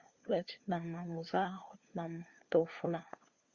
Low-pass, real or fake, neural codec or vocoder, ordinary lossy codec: 7.2 kHz; fake; codec, 16 kHz, 4 kbps, FunCodec, trained on LibriTTS, 50 frames a second; Opus, 64 kbps